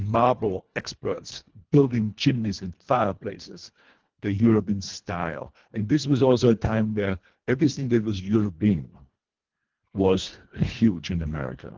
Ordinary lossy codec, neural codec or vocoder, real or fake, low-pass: Opus, 16 kbps; codec, 24 kHz, 1.5 kbps, HILCodec; fake; 7.2 kHz